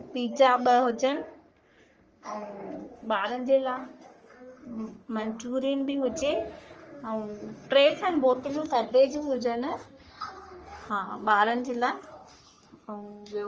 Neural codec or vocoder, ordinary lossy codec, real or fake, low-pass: codec, 44.1 kHz, 3.4 kbps, Pupu-Codec; Opus, 24 kbps; fake; 7.2 kHz